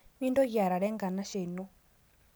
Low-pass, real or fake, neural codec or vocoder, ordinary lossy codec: none; real; none; none